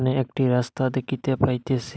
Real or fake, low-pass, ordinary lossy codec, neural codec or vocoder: real; none; none; none